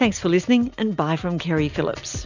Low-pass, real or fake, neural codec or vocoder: 7.2 kHz; real; none